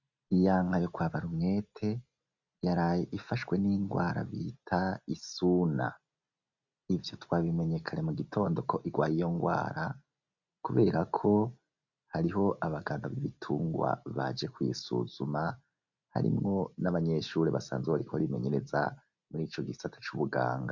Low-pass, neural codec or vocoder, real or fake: 7.2 kHz; none; real